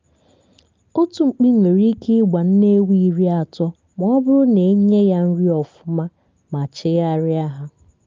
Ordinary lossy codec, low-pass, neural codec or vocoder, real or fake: Opus, 24 kbps; 7.2 kHz; none; real